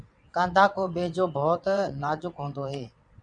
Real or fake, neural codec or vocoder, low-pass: fake; vocoder, 22.05 kHz, 80 mel bands, WaveNeXt; 9.9 kHz